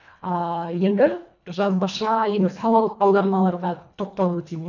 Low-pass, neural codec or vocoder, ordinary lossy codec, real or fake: 7.2 kHz; codec, 24 kHz, 1.5 kbps, HILCodec; none; fake